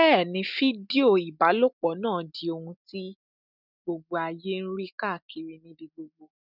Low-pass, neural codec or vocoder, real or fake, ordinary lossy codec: 5.4 kHz; none; real; none